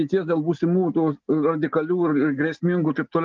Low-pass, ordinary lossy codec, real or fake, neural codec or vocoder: 7.2 kHz; Opus, 24 kbps; real; none